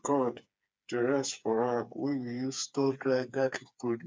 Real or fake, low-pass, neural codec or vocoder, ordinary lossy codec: fake; none; codec, 16 kHz, 4 kbps, FreqCodec, smaller model; none